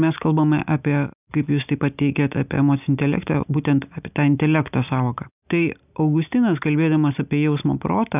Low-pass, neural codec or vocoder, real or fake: 3.6 kHz; none; real